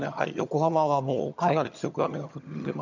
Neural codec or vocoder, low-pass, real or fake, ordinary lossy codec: vocoder, 22.05 kHz, 80 mel bands, HiFi-GAN; 7.2 kHz; fake; none